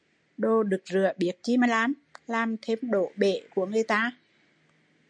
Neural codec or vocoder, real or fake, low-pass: none; real; 9.9 kHz